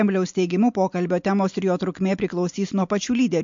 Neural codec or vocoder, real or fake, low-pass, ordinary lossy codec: none; real; 7.2 kHz; MP3, 48 kbps